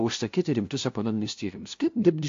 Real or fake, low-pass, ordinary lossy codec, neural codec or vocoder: fake; 7.2 kHz; AAC, 48 kbps; codec, 16 kHz, 0.5 kbps, FunCodec, trained on LibriTTS, 25 frames a second